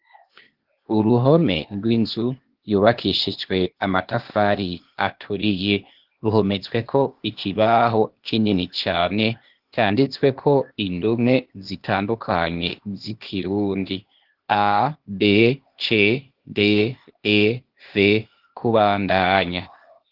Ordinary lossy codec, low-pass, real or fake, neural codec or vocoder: Opus, 16 kbps; 5.4 kHz; fake; codec, 16 kHz, 0.8 kbps, ZipCodec